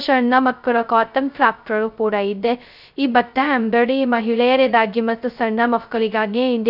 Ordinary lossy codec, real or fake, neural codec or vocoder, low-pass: none; fake; codec, 16 kHz, 0.2 kbps, FocalCodec; 5.4 kHz